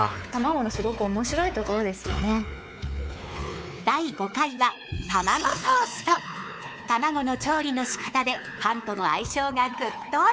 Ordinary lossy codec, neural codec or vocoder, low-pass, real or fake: none; codec, 16 kHz, 4 kbps, X-Codec, WavLM features, trained on Multilingual LibriSpeech; none; fake